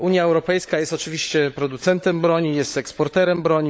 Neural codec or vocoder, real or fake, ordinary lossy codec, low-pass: codec, 16 kHz, 16 kbps, FunCodec, trained on LibriTTS, 50 frames a second; fake; none; none